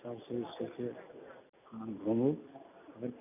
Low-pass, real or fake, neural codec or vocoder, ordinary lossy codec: 3.6 kHz; real; none; none